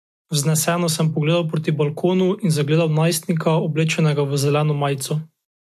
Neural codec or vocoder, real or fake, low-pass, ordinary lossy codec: none; real; 14.4 kHz; MP3, 64 kbps